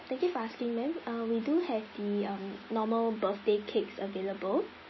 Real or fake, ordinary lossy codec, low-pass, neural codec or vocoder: real; MP3, 24 kbps; 7.2 kHz; none